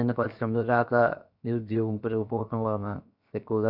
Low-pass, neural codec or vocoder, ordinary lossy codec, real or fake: 5.4 kHz; codec, 16 kHz, 0.7 kbps, FocalCodec; none; fake